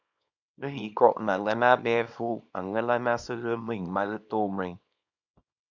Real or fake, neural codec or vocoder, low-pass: fake; codec, 24 kHz, 0.9 kbps, WavTokenizer, small release; 7.2 kHz